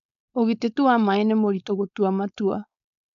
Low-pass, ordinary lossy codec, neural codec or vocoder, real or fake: 7.2 kHz; none; codec, 16 kHz, 4.8 kbps, FACodec; fake